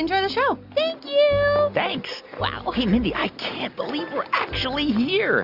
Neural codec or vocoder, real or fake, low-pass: none; real; 5.4 kHz